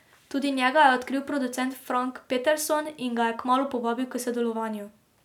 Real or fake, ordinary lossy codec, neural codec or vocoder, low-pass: real; none; none; 19.8 kHz